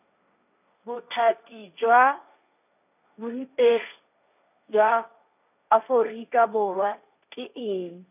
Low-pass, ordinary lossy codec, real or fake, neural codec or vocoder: 3.6 kHz; none; fake; codec, 16 kHz, 1.1 kbps, Voila-Tokenizer